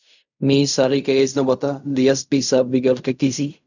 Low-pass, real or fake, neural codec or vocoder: 7.2 kHz; fake; codec, 16 kHz in and 24 kHz out, 0.4 kbps, LongCat-Audio-Codec, fine tuned four codebook decoder